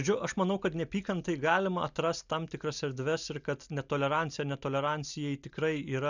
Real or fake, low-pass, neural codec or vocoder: real; 7.2 kHz; none